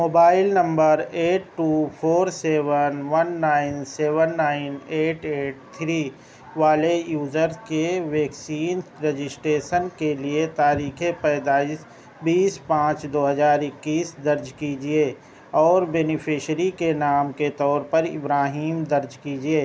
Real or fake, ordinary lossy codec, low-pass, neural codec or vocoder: real; none; none; none